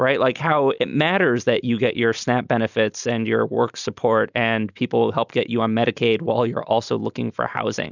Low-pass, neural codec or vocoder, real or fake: 7.2 kHz; none; real